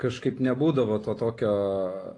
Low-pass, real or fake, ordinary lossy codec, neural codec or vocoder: 10.8 kHz; real; AAC, 32 kbps; none